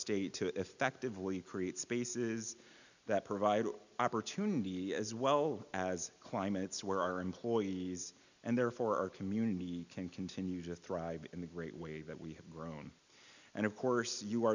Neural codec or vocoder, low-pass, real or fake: none; 7.2 kHz; real